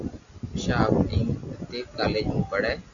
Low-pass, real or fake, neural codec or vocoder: 7.2 kHz; real; none